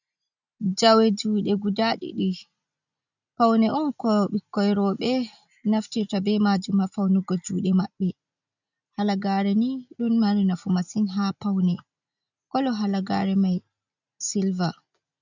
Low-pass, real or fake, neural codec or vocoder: 7.2 kHz; real; none